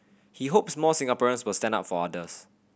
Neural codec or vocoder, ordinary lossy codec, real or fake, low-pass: none; none; real; none